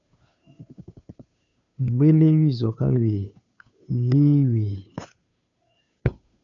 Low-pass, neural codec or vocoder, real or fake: 7.2 kHz; codec, 16 kHz, 2 kbps, FunCodec, trained on Chinese and English, 25 frames a second; fake